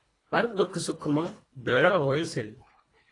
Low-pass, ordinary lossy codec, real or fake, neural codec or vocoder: 10.8 kHz; AAC, 32 kbps; fake; codec, 24 kHz, 1.5 kbps, HILCodec